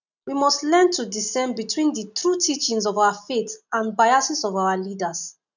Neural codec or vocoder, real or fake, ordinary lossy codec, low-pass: none; real; none; 7.2 kHz